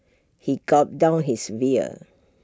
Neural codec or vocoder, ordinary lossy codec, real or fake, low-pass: none; none; real; none